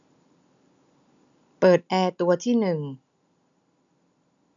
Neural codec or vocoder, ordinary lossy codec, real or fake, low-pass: none; none; real; 7.2 kHz